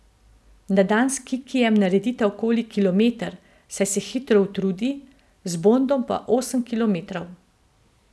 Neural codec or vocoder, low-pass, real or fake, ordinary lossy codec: none; none; real; none